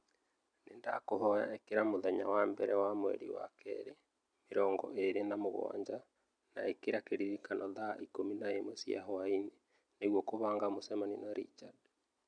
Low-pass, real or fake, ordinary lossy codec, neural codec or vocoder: 9.9 kHz; real; none; none